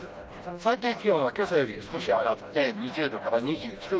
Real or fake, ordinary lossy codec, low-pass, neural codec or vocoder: fake; none; none; codec, 16 kHz, 1 kbps, FreqCodec, smaller model